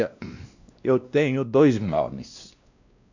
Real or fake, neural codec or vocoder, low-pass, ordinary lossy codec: fake; codec, 16 kHz, 1 kbps, X-Codec, WavLM features, trained on Multilingual LibriSpeech; 7.2 kHz; none